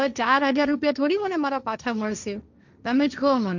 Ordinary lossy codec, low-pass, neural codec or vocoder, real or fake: none; 7.2 kHz; codec, 16 kHz, 1.1 kbps, Voila-Tokenizer; fake